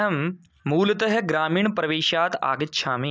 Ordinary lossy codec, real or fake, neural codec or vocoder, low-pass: none; real; none; none